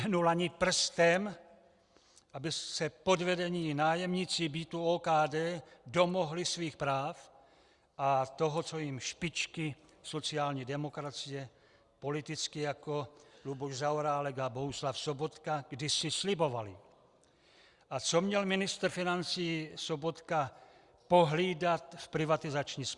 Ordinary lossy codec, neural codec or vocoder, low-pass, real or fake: Opus, 64 kbps; none; 10.8 kHz; real